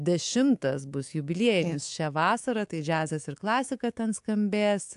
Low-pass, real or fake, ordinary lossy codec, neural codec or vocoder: 10.8 kHz; fake; AAC, 64 kbps; codec, 24 kHz, 3.1 kbps, DualCodec